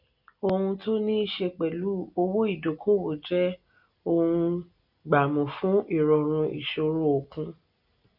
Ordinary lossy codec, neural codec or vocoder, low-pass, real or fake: none; none; 5.4 kHz; real